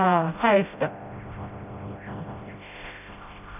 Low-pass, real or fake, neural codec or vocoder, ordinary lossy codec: 3.6 kHz; fake; codec, 16 kHz, 0.5 kbps, FreqCodec, smaller model; none